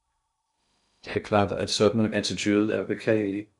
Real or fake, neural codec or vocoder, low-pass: fake; codec, 16 kHz in and 24 kHz out, 0.6 kbps, FocalCodec, streaming, 2048 codes; 10.8 kHz